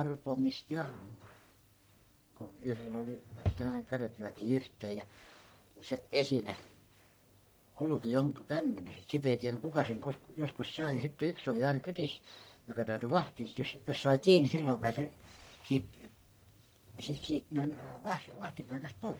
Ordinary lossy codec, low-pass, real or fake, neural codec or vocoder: none; none; fake; codec, 44.1 kHz, 1.7 kbps, Pupu-Codec